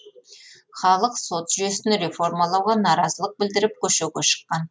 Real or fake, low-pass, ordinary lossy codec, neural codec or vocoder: real; none; none; none